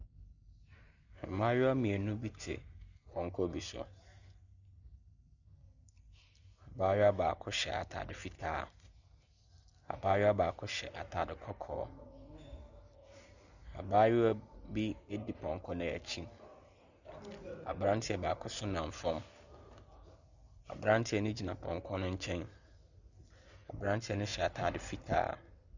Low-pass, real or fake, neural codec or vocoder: 7.2 kHz; real; none